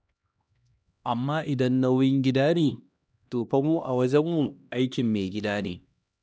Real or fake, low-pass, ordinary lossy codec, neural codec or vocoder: fake; none; none; codec, 16 kHz, 1 kbps, X-Codec, HuBERT features, trained on LibriSpeech